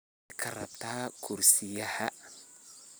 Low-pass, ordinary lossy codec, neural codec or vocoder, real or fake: none; none; none; real